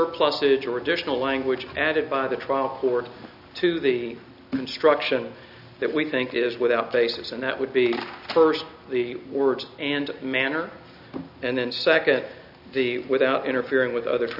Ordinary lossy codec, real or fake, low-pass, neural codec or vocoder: AAC, 48 kbps; real; 5.4 kHz; none